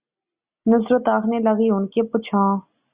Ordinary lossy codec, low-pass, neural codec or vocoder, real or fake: Opus, 64 kbps; 3.6 kHz; none; real